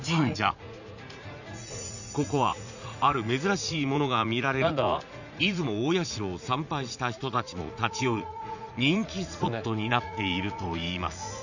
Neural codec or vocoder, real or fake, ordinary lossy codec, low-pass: vocoder, 44.1 kHz, 80 mel bands, Vocos; fake; none; 7.2 kHz